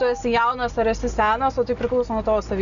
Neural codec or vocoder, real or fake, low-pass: none; real; 7.2 kHz